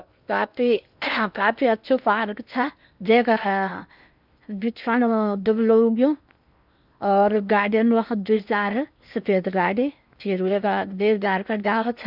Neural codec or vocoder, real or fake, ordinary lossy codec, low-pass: codec, 16 kHz in and 24 kHz out, 0.8 kbps, FocalCodec, streaming, 65536 codes; fake; none; 5.4 kHz